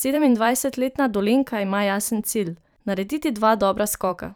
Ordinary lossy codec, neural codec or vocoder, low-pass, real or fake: none; vocoder, 44.1 kHz, 128 mel bands every 512 samples, BigVGAN v2; none; fake